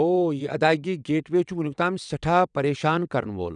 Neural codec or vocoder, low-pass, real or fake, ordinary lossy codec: vocoder, 22.05 kHz, 80 mel bands, WaveNeXt; 9.9 kHz; fake; none